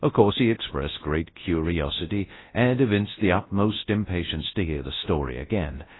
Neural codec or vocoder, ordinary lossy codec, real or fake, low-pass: codec, 24 kHz, 0.9 kbps, WavTokenizer, large speech release; AAC, 16 kbps; fake; 7.2 kHz